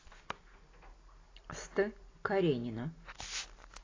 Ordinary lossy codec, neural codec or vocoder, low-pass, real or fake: AAC, 32 kbps; none; 7.2 kHz; real